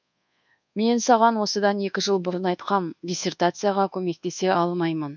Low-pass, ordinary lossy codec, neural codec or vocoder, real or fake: 7.2 kHz; none; codec, 24 kHz, 1.2 kbps, DualCodec; fake